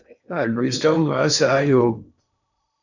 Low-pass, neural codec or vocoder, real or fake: 7.2 kHz; codec, 16 kHz in and 24 kHz out, 0.8 kbps, FocalCodec, streaming, 65536 codes; fake